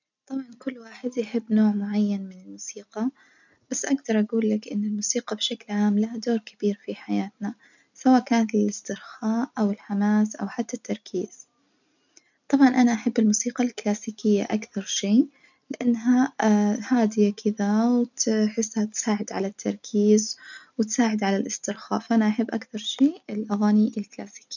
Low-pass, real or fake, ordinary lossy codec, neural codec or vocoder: 7.2 kHz; real; none; none